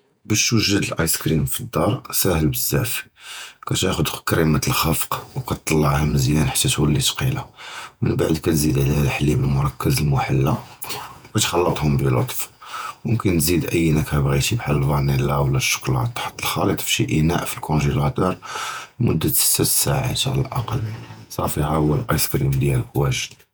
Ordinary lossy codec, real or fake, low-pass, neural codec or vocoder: none; fake; none; vocoder, 48 kHz, 128 mel bands, Vocos